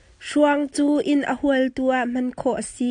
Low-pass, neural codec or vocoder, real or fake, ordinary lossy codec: 9.9 kHz; none; real; AAC, 64 kbps